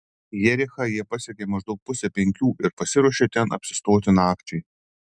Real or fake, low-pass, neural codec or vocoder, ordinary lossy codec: real; 9.9 kHz; none; MP3, 96 kbps